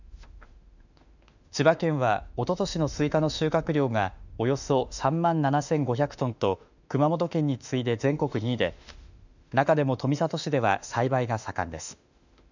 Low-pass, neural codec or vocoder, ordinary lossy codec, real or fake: 7.2 kHz; autoencoder, 48 kHz, 32 numbers a frame, DAC-VAE, trained on Japanese speech; none; fake